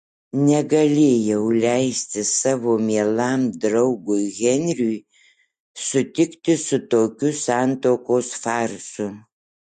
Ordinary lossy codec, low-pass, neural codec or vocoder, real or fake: MP3, 48 kbps; 14.4 kHz; none; real